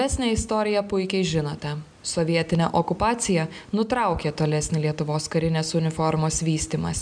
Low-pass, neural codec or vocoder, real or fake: 9.9 kHz; none; real